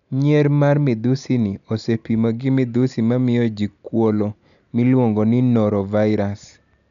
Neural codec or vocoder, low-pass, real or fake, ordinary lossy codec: none; 7.2 kHz; real; none